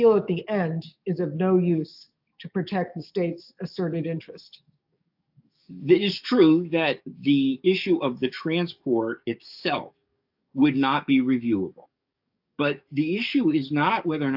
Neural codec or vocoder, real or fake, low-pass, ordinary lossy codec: codec, 44.1 kHz, 7.8 kbps, DAC; fake; 5.4 kHz; AAC, 48 kbps